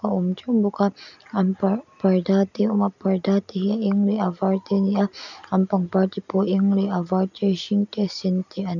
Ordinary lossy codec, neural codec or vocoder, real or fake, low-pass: none; none; real; 7.2 kHz